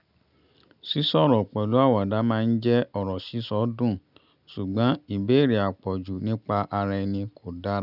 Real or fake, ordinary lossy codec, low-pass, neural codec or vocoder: real; none; 5.4 kHz; none